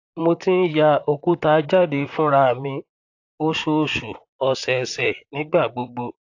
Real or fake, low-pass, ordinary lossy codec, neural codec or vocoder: fake; 7.2 kHz; AAC, 48 kbps; vocoder, 44.1 kHz, 128 mel bands, Pupu-Vocoder